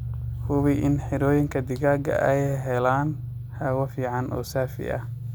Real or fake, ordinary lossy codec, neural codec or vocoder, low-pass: real; none; none; none